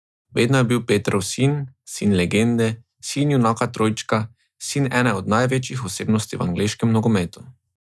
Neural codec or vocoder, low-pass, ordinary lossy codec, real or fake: none; none; none; real